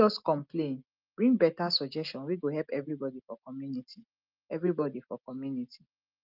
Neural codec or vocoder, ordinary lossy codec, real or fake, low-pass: none; Opus, 32 kbps; real; 5.4 kHz